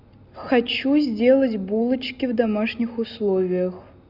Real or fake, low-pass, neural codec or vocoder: real; 5.4 kHz; none